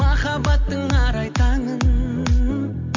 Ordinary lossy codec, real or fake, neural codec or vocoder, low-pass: MP3, 48 kbps; real; none; 7.2 kHz